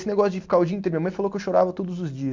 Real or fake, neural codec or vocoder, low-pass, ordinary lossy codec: real; none; 7.2 kHz; MP3, 48 kbps